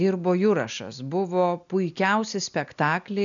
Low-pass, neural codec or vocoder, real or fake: 7.2 kHz; none; real